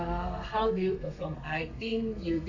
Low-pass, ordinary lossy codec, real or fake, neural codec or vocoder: 7.2 kHz; AAC, 48 kbps; fake; codec, 32 kHz, 1.9 kbps, SNAC